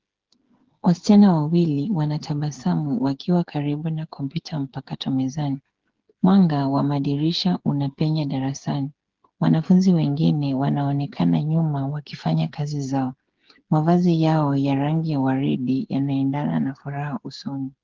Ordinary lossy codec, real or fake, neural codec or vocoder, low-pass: Opus, 16 kbps; fake; codec, 16 kHz, 8 kbps, FreqCodec, smaller model; 7.2 kHz